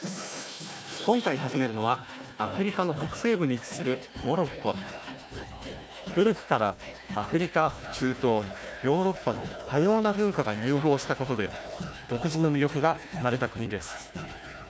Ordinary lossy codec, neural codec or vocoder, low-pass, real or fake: none; codec, 16 kHz, 1 kbps, FunCodec, trained on Chinese and English, 50 frames a second; none; fake